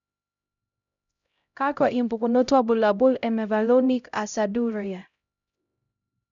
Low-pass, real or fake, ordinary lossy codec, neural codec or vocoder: 7.2 kHz; fake; none; codec, 16 kHz, 0.5 kbps, X-Codec, HuBERT features, trained on LibriSpeech